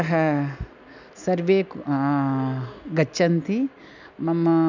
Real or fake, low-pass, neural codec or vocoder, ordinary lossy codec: real; 7.2 kHz; none; none